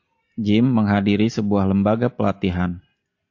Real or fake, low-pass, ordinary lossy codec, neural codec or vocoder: real; 7.2 kHz; AAC, 48 kbps; none